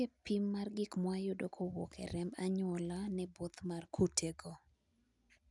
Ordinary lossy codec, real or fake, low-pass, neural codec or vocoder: none; real; 10.8 kHz; none